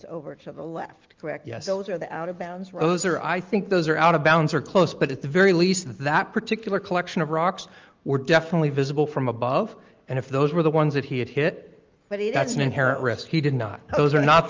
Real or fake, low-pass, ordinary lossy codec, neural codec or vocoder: real; 7.2 kHz; Opus, 32 kbps; none